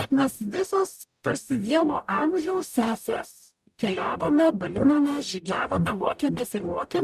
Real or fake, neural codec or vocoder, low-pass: fake; codec, 44.1 kHz, 0.9 kbps, DAC; 14.4 kHz